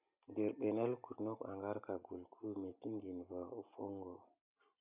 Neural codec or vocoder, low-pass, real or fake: none; 3.6 kHz; real